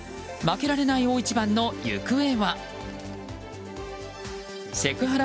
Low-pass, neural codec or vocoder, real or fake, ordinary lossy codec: none; none; real; none